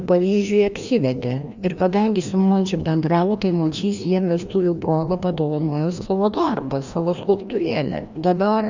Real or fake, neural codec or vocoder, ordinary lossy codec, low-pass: fake; codec, 16 kHz, 1 kbps, FreqCodec, larger model; Opus, 64 kbps; 7.2 kHz